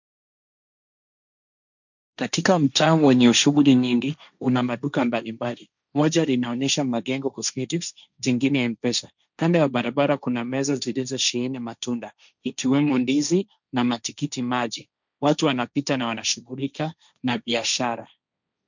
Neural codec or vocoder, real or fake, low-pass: codec, 16 kHz, 1.1 kbps, Voila-Tokenizer; fake; 7.2 kHz